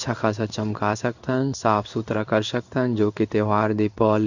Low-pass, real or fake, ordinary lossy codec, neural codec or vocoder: 7.2 kHz; fake; none; codec, 16 kHz in and 24 kHz out, 1 kbps, XY-Tokenizer